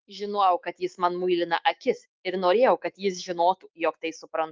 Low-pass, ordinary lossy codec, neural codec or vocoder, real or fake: 7.2 kHz; Opus, 32 kbps; autoencoder, 48 kHz, 128 numbers a frame, DAC-VAE, trained on Japanese speech; fake